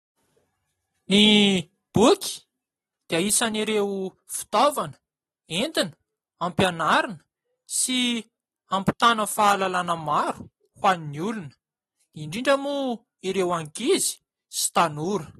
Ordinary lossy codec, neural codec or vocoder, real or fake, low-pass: AAC, 32 kbps; none; real; 19.8 kHz